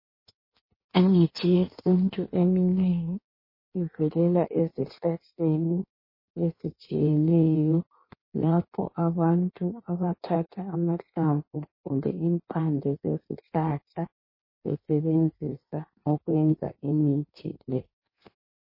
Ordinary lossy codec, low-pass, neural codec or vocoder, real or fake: MP3, 24 kbps; 5.4 kHz; codec, 16 kHz in and 24 kHz out, 1.1 kbps, FireRedTTS-2 codec; fake